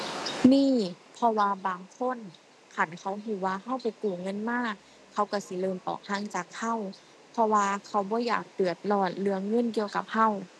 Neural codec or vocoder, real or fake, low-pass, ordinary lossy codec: none; real; none; none